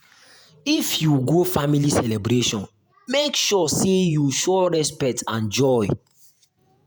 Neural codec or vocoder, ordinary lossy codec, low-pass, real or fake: vocoder, 48 kHz, 128 mel bands, Vocos; none; none; fake